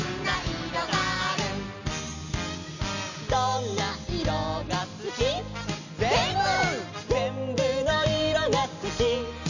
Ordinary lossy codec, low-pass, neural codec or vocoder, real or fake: none; 7.2 kHz; none; real